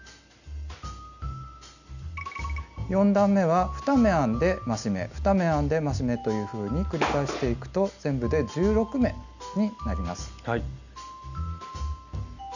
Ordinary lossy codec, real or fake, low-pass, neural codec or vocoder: none; real; 7.2 kHz; none